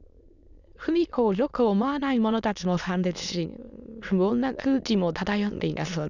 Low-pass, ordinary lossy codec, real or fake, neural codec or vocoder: 7.2 kHz; none; fake; autoencoder, 22.05 kHz, a latent of 192 numbers a frame, VITS, trained on many speakers